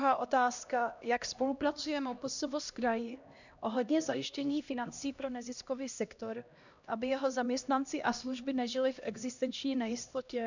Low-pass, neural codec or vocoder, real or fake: 7.2 kHz; codec, 16 kHz, 1 kbps, X-Codec, HuBERT features, trained on LibriSpeech; fake